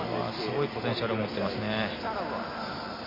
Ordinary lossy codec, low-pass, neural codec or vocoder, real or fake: MP3, 24 kbps; 5.4 kHz; none; real